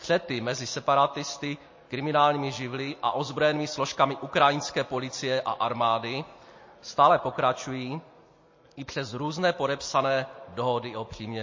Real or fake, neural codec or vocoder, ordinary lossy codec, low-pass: real; none; MP3, 32 kbps; 7.2 kHz